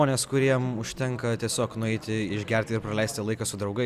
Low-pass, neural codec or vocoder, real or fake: 14.4 kHz; none; real